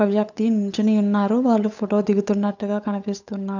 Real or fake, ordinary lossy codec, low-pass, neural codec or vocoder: fake; none; 7.2 kHz; codec, 16 kHz, 8 kbps, FunCodec, trained on Chinese and English, 25 frames a second